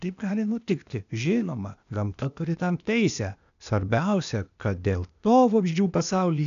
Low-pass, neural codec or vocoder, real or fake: 7.2 kHz; codec, 16 kHz, 0.8 kbps, ZipCodec; fake